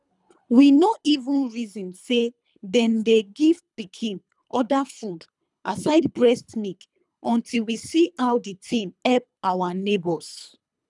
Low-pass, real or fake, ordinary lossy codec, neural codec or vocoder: 10.8 kHz; fake; none; codec, 24 kHz, 3 kbps, HILCodec